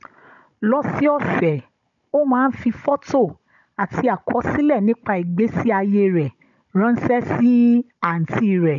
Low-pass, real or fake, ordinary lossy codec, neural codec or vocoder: 7.2 kHz; fake; none; codec, 16 kHz, 16 kbps, FunCodec, trained on Chinese and English, 50 frames a second